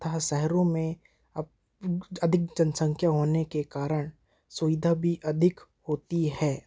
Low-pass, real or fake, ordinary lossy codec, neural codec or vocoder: none; real; none; none